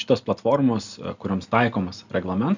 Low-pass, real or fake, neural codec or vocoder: 7.2 kHz; real; none